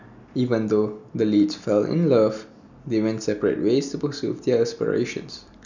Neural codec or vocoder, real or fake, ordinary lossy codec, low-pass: none; real; none; 7.2 kHz